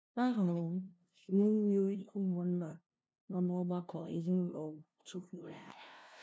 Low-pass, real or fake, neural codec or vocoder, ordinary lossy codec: none; fake; codec, 16 kHz, 0.5 kbps, FunCodec, trained on LibriTTS, 25 frames a second; none